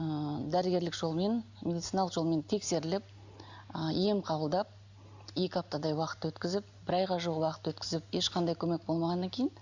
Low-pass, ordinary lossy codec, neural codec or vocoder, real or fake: 7.2 kHz; Opus, 64 kbps; none; real